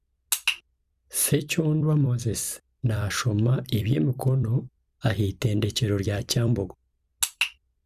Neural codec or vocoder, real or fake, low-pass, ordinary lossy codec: vocoder, 44.1 kHz, 128 mel bands every 256 samples, BigVGAN v2; fake; 14.4 kHz; Opus, 64 kbps